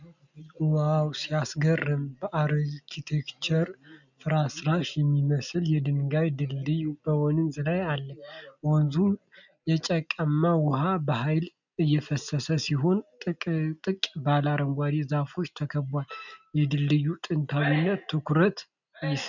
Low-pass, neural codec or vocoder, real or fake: 7.2 kHz; none; real